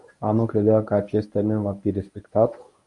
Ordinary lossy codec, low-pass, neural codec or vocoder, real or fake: MP3, 96 kbps; 10.8 kHz; codec, 24 kHz, 0.9 kbps, WavTokenizer, medium speech release version 1; fake